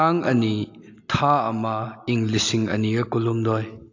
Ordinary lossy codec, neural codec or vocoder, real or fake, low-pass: AAC, 48 kbps; none; real; 7.2 kHz